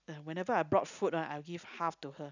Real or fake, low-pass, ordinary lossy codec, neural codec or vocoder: real; 7.2 kHz; none; none